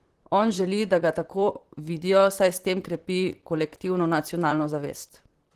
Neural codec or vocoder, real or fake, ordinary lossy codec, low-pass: vocoder, 44.1 kHz, 128 mel bands, Pupu-Vocoder; fake; Opus, 16 kbps; 14.4 kHz